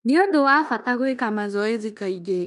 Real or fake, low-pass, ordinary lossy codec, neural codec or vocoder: fake; 10.8 kHz; none; codec, 16 kHz in and 24 kHz out, 0.9 kbps, LongCat-Audio-Codec, four codebook decoder